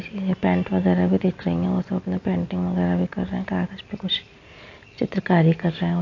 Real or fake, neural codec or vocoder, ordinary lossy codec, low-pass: real; none; AAC, 48 kbps; 7.2 kHz